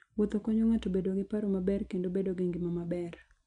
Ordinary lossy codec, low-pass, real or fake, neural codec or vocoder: none; 9.9 kHz; real; none